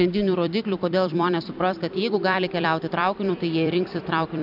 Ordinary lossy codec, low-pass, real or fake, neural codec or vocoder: AAC, 48 kbps; 5.4 kHz; fake; vocoder, 44.1 kHz, 128 mel bands every 256 samples, BigVGAN v2